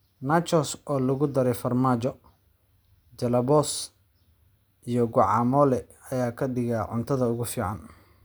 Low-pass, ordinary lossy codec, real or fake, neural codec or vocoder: none; none; real; none